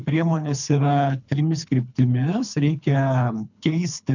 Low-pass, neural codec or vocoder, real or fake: 7.2 kHz; codec, 24 kHz, 3 kbps, HILCodec; fake